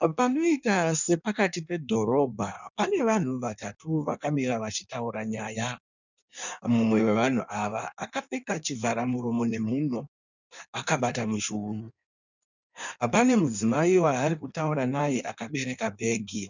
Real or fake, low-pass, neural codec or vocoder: fake; 7.2 kHz; codec, 16 kHz in and 24 kHz out, 1.1 kbps, FireRedTTS-2 codec